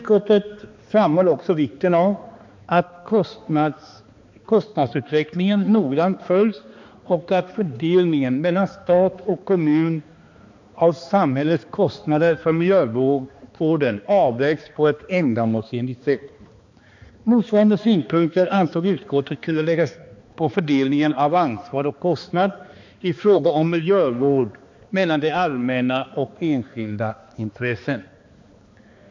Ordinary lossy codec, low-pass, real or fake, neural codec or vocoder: MP3, 48 kbps; 7.2 kHz; fake; codec, 16 kHz, 2 kbps, X-Codec, HuBERT features, trained on balanced general audio